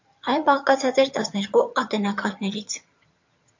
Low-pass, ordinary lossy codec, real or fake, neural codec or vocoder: 7.2 kHz; MP3, 48 kbps; fake; vocoder, 22.05 kHz, 80 mel bands, HiFi-GAN